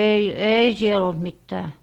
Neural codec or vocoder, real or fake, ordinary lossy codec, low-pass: none; real; Opus, 16 kbps; 19.8 kHz